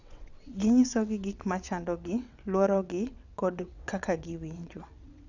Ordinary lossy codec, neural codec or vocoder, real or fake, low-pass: none; vocoder, 22.05 kHz, 80 mel bands, WaveNeXt; fake; 7.2 kHz